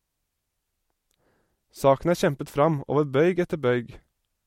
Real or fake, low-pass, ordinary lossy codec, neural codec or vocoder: real; 19.8 kHz; MP3, 64 kbps; none